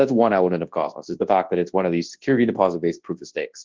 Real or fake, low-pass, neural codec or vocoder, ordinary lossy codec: fake; 7.2 kHz; codec, 24 kHz, 0.9 kbps, WavTokenizer, large speech release; Opus, 16 kbps